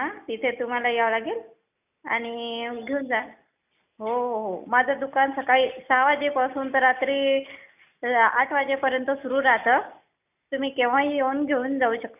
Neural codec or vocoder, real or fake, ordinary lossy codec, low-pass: none; real; none; 3.6 kHz